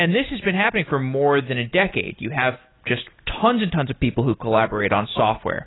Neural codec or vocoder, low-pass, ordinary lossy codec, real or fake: none; 7.2 kHz; AAC, 16 kbps; real